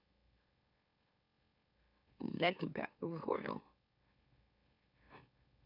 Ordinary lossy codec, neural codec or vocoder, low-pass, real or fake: none; autoencoder, 44.1 kHz, a latent of 192 numbers a frame, MeloTTS; 5.4 kHz; fake